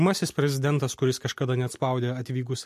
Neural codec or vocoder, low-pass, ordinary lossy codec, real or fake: none; 14.4 kHz; MP3, 64 kbps; real